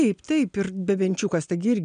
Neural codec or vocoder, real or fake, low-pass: none; real; 9.9 kHz